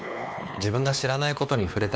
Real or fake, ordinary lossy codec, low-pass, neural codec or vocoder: fake; none; none; codec, 16 kHz, 2 kbps, X-Codec, WavLM features, trained on Multilingual LibriSpeech